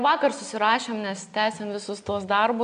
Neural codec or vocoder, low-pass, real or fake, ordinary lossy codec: none; 9.9 kHz; real; MP3, 64 kbps